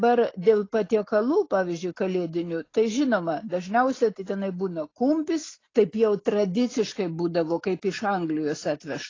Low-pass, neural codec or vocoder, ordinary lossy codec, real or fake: 7.2 kHz; none; AAC, 32 kbps; real